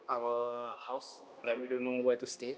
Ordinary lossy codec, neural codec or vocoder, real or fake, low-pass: none; codec, 16 kHz, 1 kbps, X-Codec, HuBERT features, trained on balanced general audio; fake; none